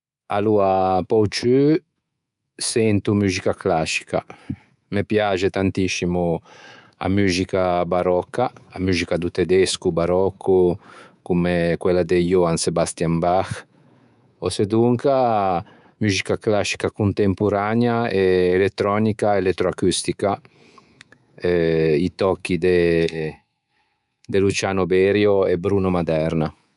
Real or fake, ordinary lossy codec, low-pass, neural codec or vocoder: fake; none; 10.8 kHz; codec, 24 kHz, 3.1 kbps, DualCodec